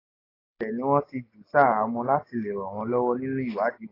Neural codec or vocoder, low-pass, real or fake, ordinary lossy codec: none; 5.4 kHz; real; AAC, 24 kbps